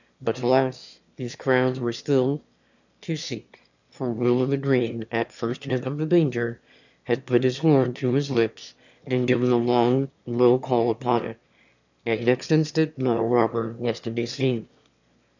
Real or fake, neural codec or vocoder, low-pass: fake; autoencoder, 22.05 kHz, a latent of 192 numbers a frame, VITS, trained on one speaker; 7.2 kHz